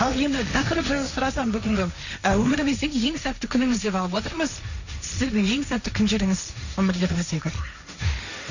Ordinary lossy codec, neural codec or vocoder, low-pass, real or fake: none; codec, 16 kHz, 1.1 kbps, Voila-Tokenizer; 7.2 kHz; fake